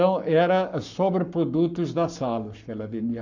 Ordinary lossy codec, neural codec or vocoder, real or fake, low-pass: none; codec, 44.1 kHz, 7.8 kbps, Pupu-Codec; fake; 7.2 kHz